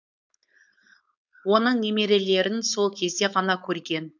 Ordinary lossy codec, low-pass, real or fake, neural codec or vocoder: none; 7.2 kHz; fake; codec, 16 kHz, 4.8 kbps, FACodec